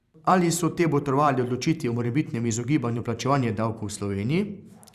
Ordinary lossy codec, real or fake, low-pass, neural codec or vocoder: Opus, 64 kbps; fake; 14.4 kHz; vocoder, 44.1 kHz, 128 mel bands every 512 samples, BigVGAN v2